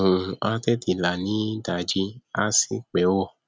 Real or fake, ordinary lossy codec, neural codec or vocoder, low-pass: real; none; none; none